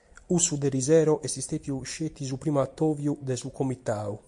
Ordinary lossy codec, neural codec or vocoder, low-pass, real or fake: MP3, 64 kbps; none; 10.8 kHz; real